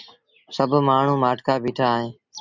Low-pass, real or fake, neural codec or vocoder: 7.2 kHz; real; none